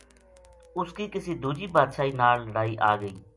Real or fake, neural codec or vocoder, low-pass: real; none; 10.8 kHz